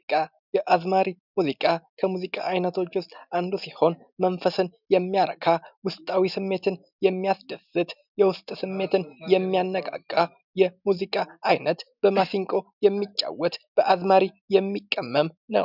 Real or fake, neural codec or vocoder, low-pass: real; none; 5.4 kHz